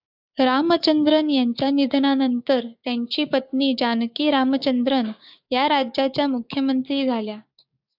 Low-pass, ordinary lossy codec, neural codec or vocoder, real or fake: 5.4 kHz; AAC, 48 kbps; codec, 16 kHz, 6 kbps, DAC; fake